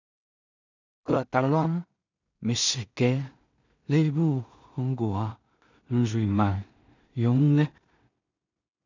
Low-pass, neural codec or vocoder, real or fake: 7.2 kHz; codec, 16 kHz in and 24 kHz out, 0.4 kbps, LongCat-Audio-Codec, two codebook decoder; fake